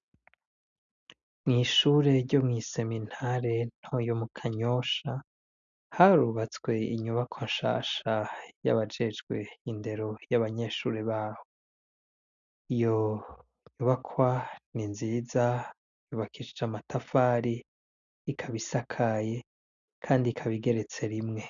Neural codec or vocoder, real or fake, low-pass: none; real; 7.2 kHz